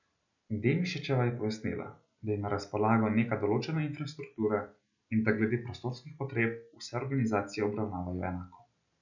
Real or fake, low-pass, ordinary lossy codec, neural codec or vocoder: real; 7.2 kHz; none; none